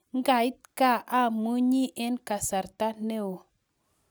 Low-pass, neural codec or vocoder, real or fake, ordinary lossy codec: none; none; real; none